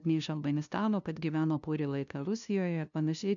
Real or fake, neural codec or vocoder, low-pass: fake; codec, 16 kHz, 0.5 kbps, FunCodec, trained on LibriTTS, 25 frames a second; 7.2 kHz